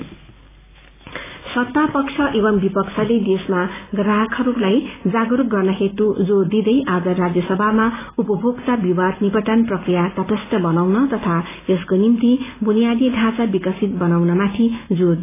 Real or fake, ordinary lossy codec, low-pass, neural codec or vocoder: real; AAC, 16 kbps; 3.6 kHz; none